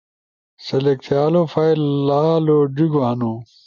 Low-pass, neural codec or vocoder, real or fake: 7.2 kHz; none; real